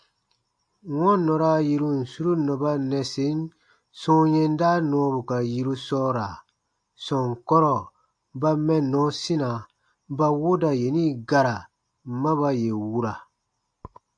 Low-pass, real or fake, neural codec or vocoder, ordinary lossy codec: 9.9 kHz; real; none; AAC, 64 kbps